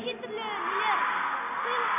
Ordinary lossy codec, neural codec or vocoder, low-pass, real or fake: MP3, 24 kbps; none; 3.6 kHz; real